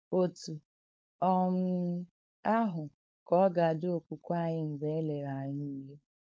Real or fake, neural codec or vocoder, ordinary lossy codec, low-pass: fake; codec, 16 kHz, 4.8 kbps, FACodec; none; none